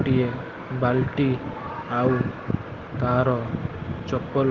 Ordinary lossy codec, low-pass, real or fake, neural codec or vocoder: Opus, 16 kbps; 7.2 kHz; real; none